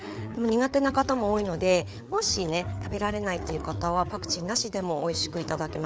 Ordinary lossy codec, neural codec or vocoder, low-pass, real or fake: none; codec, 16 kHz, 4 kbps, FreqCodec, larger model; none; fake